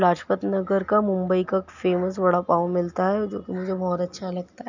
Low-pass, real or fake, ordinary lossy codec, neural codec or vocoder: 7.2 kHz; real; none; none